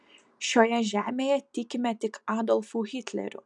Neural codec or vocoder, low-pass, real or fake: none; 10.8 kHz; real